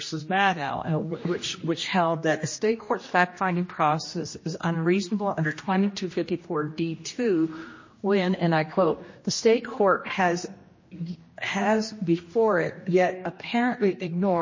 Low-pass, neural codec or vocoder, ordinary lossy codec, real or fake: 7.2 kHz; codec, 16 kHz, 1 kbps, X-Codec, HuBERT features, trained on general audio; MP3, 32 kbps; fake